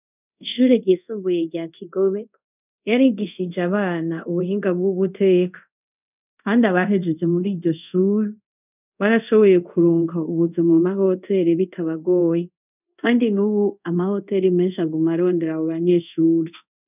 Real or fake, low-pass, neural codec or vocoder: fake; 3.6 kHz; codec, 24 kHz, 0.5 kbps, DualCodec